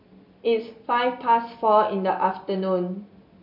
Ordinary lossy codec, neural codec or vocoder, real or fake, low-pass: MP3, 48 kbps; none; real; 5.4 kHz